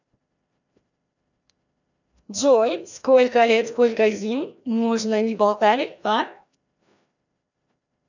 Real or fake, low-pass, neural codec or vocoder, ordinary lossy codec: fake; 7.2 kHz; codec, 16 kHz, 1 kbps, FreqCodec, larger model; none